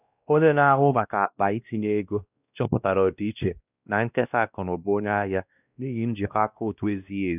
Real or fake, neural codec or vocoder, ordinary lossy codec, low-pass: fake; codec, 16 kHz, 1 kbps, X-Codec, WavLM features, trained on Multilingual LibriSpeech; none; 3.6 kHz